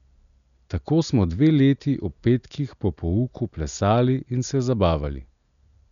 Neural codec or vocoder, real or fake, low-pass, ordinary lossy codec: none; real; 7.2 kHz; none